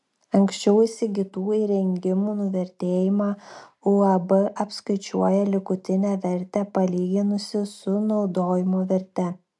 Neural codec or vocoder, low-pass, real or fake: none; 10.8 kHz; real